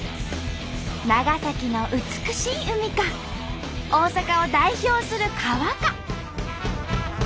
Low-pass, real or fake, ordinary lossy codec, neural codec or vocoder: none; real; none; none